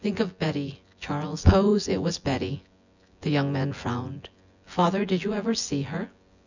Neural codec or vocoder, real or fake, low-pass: vocoder, 24 kHz, 100 mel bands, Vocos; fake; 7.2 kHz